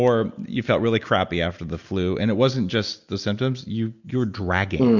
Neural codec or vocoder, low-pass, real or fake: none; 7.2 kHz; real